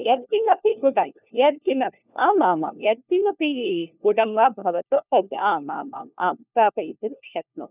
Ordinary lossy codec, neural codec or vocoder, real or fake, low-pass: none; codec, 16 kHz, 1 kbps, FunCodec, trained on LibriTTS, 50 frames a second; fake; 3.6 kHz